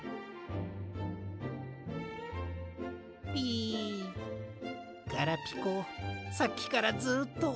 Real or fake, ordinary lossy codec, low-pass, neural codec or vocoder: real; none; none; none